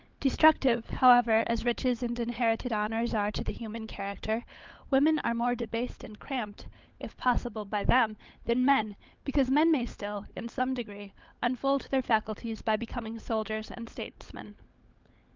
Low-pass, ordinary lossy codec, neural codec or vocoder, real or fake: 7.2 kHz; Opus, 24 kbps; codec, 16 kHz, 16 kbps, FunCodec, trained on LibriTTS, 50 frames a second; fake